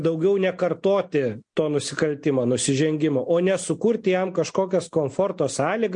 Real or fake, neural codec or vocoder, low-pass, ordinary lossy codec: real; none; 9.9 kHz; MP3, 48 kbps